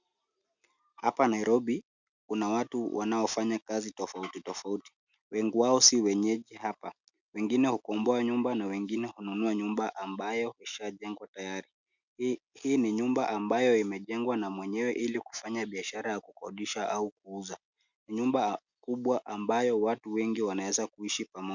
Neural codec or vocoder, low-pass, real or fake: none; 7.2 kHz; real